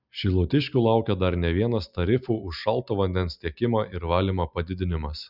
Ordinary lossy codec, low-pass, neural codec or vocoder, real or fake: Opus, 64 kbps; 5.4 kHz; none; real